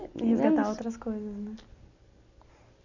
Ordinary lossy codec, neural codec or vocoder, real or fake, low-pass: none; none; real; 7.2 kHz